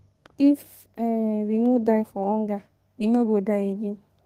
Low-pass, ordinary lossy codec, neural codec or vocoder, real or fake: 14.4 kHz; Opus, 24 kbps; codec, 32 kHz, 1.9 kbps, SNAC; fake